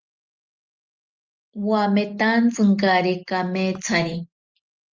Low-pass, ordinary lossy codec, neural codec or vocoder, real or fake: 7.2 kHz; Opus, 24 kbps; none; real